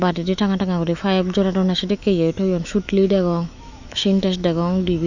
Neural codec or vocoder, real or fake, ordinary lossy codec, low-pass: autoencoder, 48 kHz, 128 numbers a frame, DAC-VAE, trained on Japanese speech; fake; none; 7.2 kHz